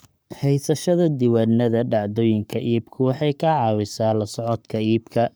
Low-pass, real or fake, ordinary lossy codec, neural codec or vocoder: none; fake; none; codec, 44.1 kHz, 7.8 kbps, Pupu-Codec